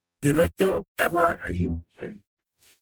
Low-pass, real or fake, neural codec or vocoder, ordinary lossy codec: none; fake; codec, 44.1 kHz, 0.9 kbps, DAC; none